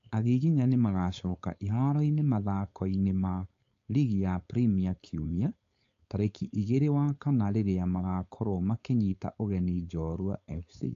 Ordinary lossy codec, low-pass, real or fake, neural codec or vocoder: AAC, 48 kbps; 7.2 kHz; fake; codec, 16 kHz, 4.8 kbps, FACodec